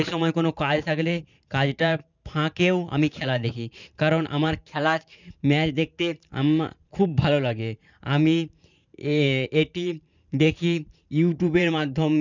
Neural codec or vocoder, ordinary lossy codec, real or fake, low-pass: vocoder, 44.1 kHz, 128 mel bands every 512 samples, BigVGAN v2; none; fake; 7.2 kHz